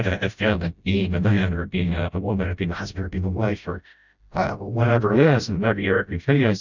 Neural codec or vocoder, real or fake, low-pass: codec, 16 kHz, 0.5 kbps, FreqCodec, smaller model; fake; 7.2 kHz